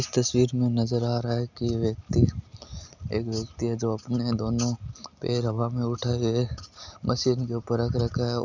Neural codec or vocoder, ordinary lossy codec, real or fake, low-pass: none; none; real; 7.2 kHz